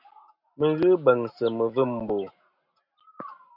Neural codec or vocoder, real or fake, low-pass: none; real; 5.4 kHz